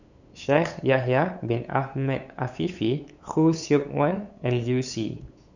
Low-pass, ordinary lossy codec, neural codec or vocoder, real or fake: 7.2 kHz; none; codec, 16 kHz, 8 kbps, FunCodec, trained on LibriTTS, 25 frames a second; fake